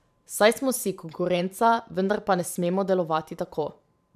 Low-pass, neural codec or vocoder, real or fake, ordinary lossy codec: 14.4 kHz; vocoder, 44.1 kHz, 128 mel bands every 512 samples, BigVGAN v2; fake; none